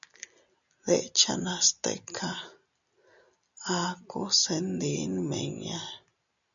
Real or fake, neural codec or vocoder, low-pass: real; none; 7.2 kHz